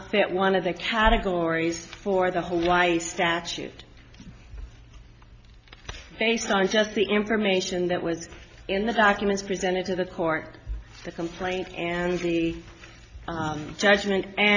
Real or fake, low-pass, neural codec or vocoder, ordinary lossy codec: real; 7.2 kHz; none; AAC, 48 kbps